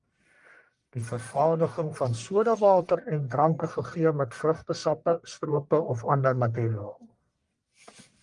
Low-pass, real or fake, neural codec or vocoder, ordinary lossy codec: 10.8 kHz; fake; codec, 44.1 kHz, 1.7 kbps, Pupu-Codec; Opus, 32 kbps